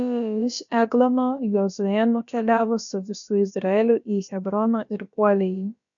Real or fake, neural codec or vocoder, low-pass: fake; codec, 16 kHz, about 1 kbps, DyCAST, with the encoder's durations; 7.2 kHz